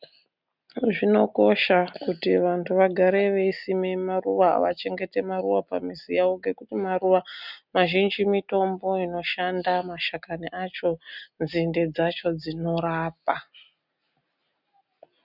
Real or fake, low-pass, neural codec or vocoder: real; 5.4 kHz; none